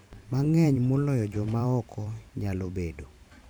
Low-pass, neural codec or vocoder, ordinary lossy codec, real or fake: none; vocoder, 44.1 kHz, 128 mel bands every 512 samples, BigVGAN v2; none; fake